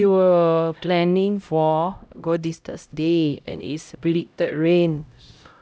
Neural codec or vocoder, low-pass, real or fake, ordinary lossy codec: codec, 16 kHz, 0.5 kbps, X-Codec, HuBERT features, trained on LibriSpeech; none; fake; none